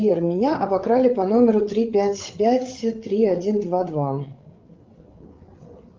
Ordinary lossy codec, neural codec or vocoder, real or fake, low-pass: Opus, 24 kbps; codec, 16 kHz, 8 kbps, FreqCodec, larger model; fake; 7.2 kHz